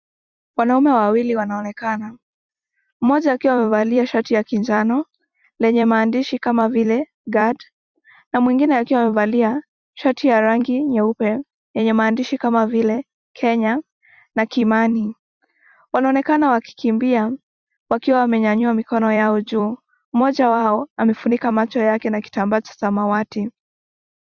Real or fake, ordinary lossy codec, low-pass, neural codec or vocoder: fake; Opus, 64 kbps; 7.2 kHz; vocoder, 44.1 kHz, 128 mel bands every 256 samples, BigVGAN v2